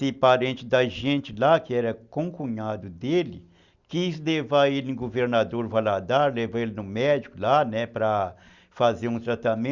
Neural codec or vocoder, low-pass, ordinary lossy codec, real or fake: none; 7.2 kHz; Opus, 64 kbps; real